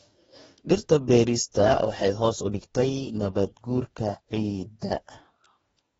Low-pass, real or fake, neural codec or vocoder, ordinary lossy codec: 19.8 kHz; fake; codec, 44.1 kHz, 2.6 kbps, DAC; AAC, 24 kbps